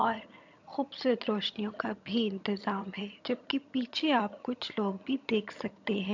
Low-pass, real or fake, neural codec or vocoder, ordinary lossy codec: 7.2 kHz; fake; vocoder, 22.05 kHz, 80 mel bands, HiFi-GAN; MP3, 64 kbps